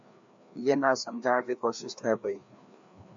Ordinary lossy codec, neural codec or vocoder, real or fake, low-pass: AAC, 64 kbps; codec, 16 kHz, 2 kbps, FreqCodec, larger model; fake; 7.2 kHz